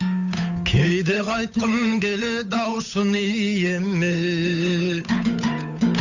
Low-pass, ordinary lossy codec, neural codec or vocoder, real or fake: 7.2 kHz; none; codec, 16 kHz, 8 kbps, FunCodec, trained on Chinese and English, 25 frames a second; fake